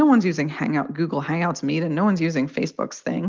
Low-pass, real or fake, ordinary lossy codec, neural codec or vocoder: 7.2 kHz; real; Opus, 32 kbps; none